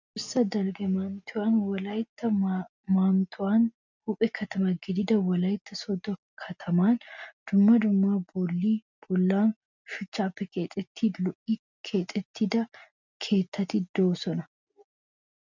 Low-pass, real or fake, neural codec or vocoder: 7.2 kHz; real; none